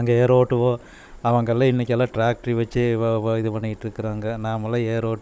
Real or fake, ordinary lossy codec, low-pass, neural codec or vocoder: fake; none; none; codec, 16 kHz, 16 kbps, FunCodec, trained on Chinese and English, 50 frames a second